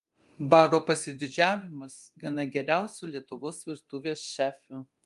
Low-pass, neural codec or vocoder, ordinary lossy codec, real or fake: 10.8 kHz; codec, 24 kHz, 0.9 kbps, DualCodec; Opus, 32 kbps; fake